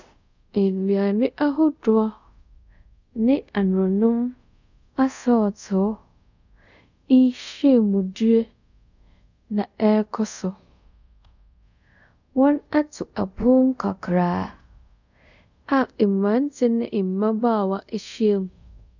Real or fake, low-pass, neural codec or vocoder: fake; 7.2 kHz; codec, 24 kHz, 0.5 kbps, DualCodec